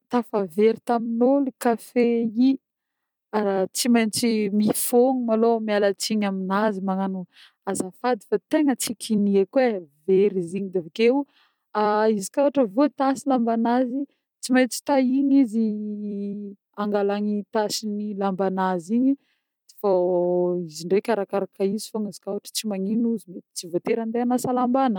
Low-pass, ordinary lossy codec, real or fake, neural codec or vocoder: 19.8 kHz; none; fake; vocoder, 44.1 kHz, 128 mel bands every 256 samples, BigVGAN v2